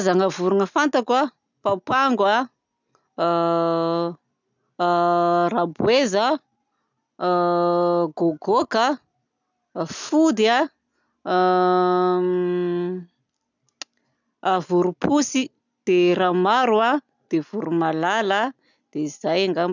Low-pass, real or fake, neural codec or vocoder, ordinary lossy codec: 7.2 kHz; real; none; none